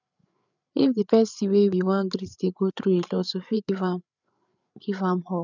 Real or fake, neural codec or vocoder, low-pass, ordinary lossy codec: fake; codec, 16 kHz, 8 kbps, FreqCodec, larger model; 7.2 kHz; none